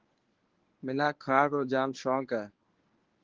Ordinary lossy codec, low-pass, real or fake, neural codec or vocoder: Opus, 16 kbps; 7.2 kHz; fake; codec, 24 kHz, 0.9 kbps, WavTokenizer, medium speech release version 1